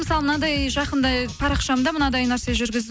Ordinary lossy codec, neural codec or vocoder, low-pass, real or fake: none; none; none; real